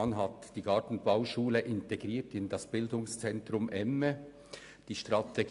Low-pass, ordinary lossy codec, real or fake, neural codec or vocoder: 10.8 kHz; AAC, 64 kbps; real; none